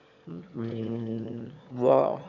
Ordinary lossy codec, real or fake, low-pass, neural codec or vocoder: none; fake; 7.2 kHz; autoencoder, 22.05 kHz, a latent of 192 numbers a frame, VITS, trained on one speaker